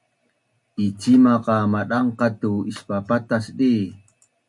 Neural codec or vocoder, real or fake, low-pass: none; real; 10.8 kHz